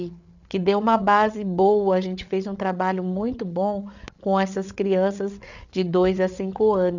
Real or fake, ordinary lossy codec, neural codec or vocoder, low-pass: fake; none; codec, 16 kHz, 8 kbps, FreqCodec, larger model; 7.2 kHz